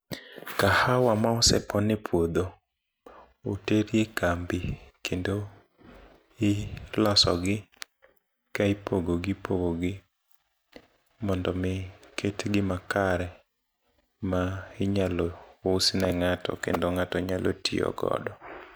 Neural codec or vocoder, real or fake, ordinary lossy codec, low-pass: none; real; none; none